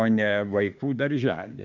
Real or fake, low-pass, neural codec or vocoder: fake; 7.2 kHz; autoencoder, 48 kHz, 32 numbers a frame, DAC-VAE, trained on Japanese speech